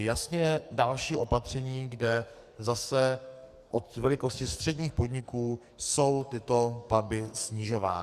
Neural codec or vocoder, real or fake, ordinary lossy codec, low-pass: codec, 44.1 kHz, 2.6 kbps, SNAC; fake; Opus, 64 kbps; 14.4 kHz